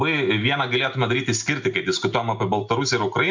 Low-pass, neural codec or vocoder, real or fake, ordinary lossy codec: 7.2 kHz; none; real; MP3, 64 kbps